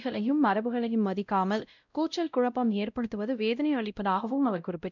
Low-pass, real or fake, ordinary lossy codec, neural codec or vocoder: 7.2 kHz; fake; none; codec, 16 kHz, 0.5 kbps, X-Codec, WavLM features, trained on Multilingual LibriSpeech